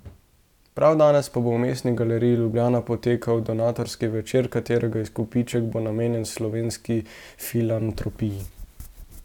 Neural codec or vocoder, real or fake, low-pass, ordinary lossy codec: none; real; 19.8 kHz; none